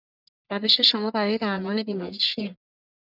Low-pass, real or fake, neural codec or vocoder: 5.4 kHz; fake; codec, 44.1 kHz, 1.7 kbps, Pupu-Codec